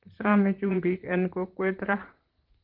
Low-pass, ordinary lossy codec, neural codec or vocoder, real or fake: 5.4 kHz; Opus, 16 kbps; vocoder, 44.1 kHz, 128 mel bands, Pupu-Vocoder; fake